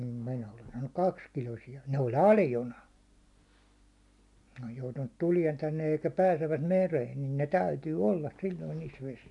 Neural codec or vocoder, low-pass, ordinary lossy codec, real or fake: none; 10.8 kHz; none; real